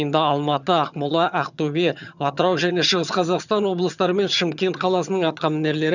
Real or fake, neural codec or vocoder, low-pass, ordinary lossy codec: fake; vocoder, 22.05 kHz, 80 mel bands, HiFi-GAN; 7.2 kHz; none